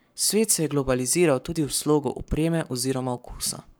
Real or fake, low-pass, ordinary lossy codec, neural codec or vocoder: fake; none; none; codec, 44.1 kHz, 7.8 kbps, Pupu-Codec